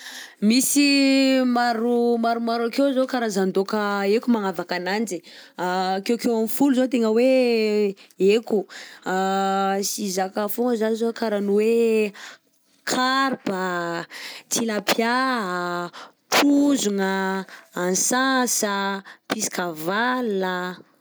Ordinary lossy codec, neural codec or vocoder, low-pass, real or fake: none; none; none; real